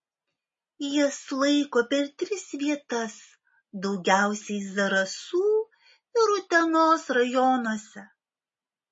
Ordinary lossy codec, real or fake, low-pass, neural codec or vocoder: MP3, 32 kbps; real; 10.8 kHz; none